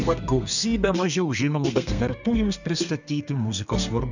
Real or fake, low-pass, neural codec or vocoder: fake; 7.2 kHz; codec, 32 kHz, 1.9 kbps, SNAC